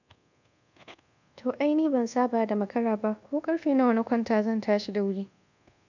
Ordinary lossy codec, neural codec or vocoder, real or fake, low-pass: none; codec, 24 kHz, 1.2 kbps, DualCodec; fake; 7.2 kHz